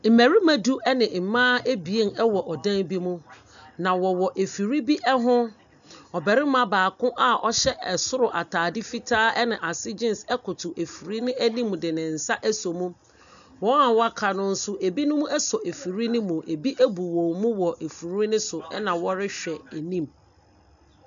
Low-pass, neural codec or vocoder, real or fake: 7.2 kHz; none; real